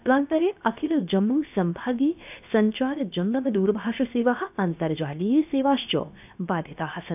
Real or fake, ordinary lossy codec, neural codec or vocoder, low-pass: fake; none; codec, 16 kHz, 0.7 kbps, FocalCodec; 3.6 kHz